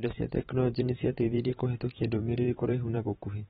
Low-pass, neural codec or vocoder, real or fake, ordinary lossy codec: 19.8 kHz; vocoder, 44.1 kHz, 128 mel bands every 512 samples, BigVGAN v2; fake; AAC, 16 kbps